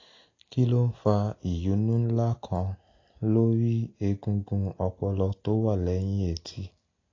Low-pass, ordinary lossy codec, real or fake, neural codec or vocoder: 7.2 kHz; AAC, 32 kbps; real; none